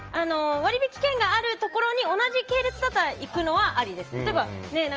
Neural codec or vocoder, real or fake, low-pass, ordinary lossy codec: none; real; 7.2 kHz; Opus, 24 kbps